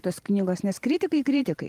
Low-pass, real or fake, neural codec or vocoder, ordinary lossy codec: 14.4 kHz; fake; vocoder, 44.1 kHz, 128 mel bands every 512 samples, BigVGAN v2; Opus, 32 kbps